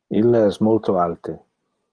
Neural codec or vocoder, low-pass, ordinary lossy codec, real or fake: none; 9.9 kHz; Opus, 32 kbps; real